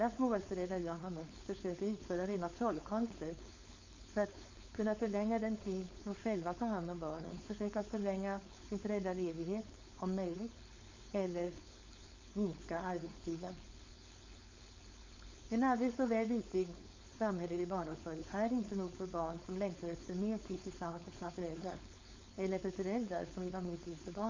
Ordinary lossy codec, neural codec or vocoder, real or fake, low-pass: MP3, 64 kbps; codec, 16 kHz, 4.8 kbps, FACodec; fake; 7.2 kHz